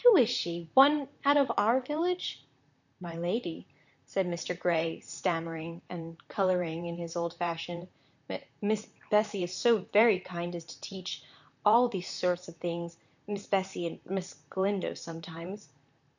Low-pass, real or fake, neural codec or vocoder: 7.2 kHz; fake; vocoder, 44.1 kHz, 128 mel bands, Pupu-Vocoder